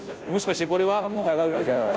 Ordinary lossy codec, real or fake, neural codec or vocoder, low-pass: none; fake; codec, 16 kHz, 0.5 kbps, FunCodec, trained on Chinese and English, 25 frames a second; none